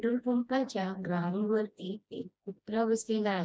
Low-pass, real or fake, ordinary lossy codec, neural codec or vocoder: none; fake; none; codec, 16 kHz, 1 kbps, FreqCodec, smaller model